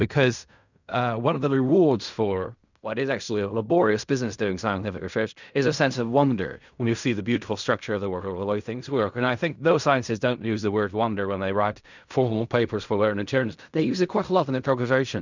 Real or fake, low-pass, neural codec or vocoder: fake; 7.2 kHz; codec, 16 kHz in and 24 kHz out, 0.4 kbps, LongCat-Audio-Codec, fine tuned four codebook decoder